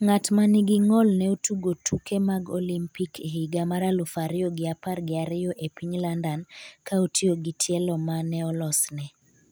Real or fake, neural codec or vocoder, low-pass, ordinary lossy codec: real; none; none; none